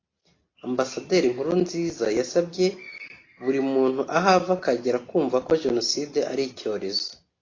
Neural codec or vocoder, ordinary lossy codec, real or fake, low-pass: none; AAC, 32 kbps; real; 7.2 kHz